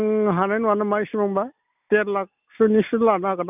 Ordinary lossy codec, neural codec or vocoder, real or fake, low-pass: none; none; real; 3.6 kHz